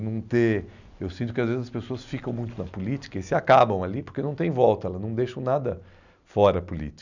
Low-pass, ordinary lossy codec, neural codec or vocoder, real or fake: 7.2 kHz; none; none; real